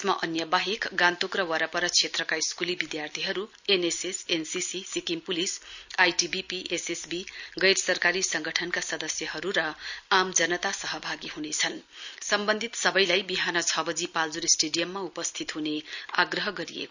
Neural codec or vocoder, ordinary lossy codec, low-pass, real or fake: none; none; 7.2 kHz; real